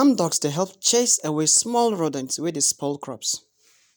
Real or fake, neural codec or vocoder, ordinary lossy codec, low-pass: real; none; none; none